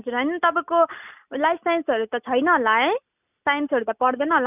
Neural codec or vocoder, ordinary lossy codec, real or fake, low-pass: none; none; real; 3.6 kHz